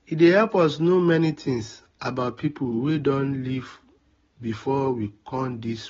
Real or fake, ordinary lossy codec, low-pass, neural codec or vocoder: real; AAC, 24 kbps; 7.2 kHz; none